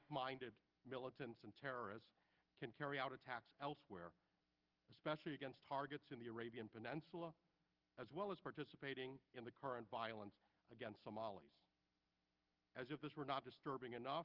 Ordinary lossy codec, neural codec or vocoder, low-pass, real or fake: Opus, 32 kbps; none; 5.4 kHz; real